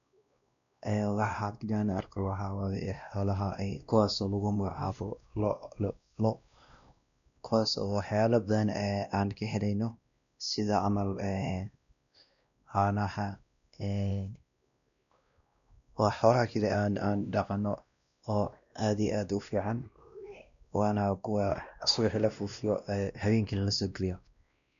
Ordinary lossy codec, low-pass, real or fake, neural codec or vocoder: none; 7.2 kHz; fake; codec, 16 kHz, 1 kbps, X-Codec, WavLM features, trained on Multilingual LibriSpeech